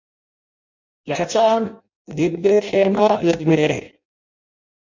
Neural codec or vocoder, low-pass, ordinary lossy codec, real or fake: codec, 16 kHz in and 24 kHz out, 0.6 kbps, FireRedTTS-2 codec; 7.2 kHz; MP3, 48 kbps; fake